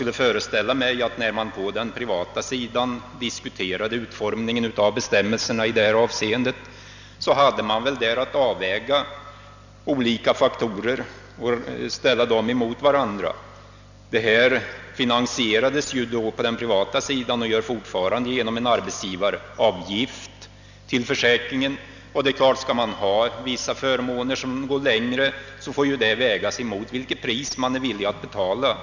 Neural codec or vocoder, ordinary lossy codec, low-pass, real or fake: none; none; 7.2 kHz; real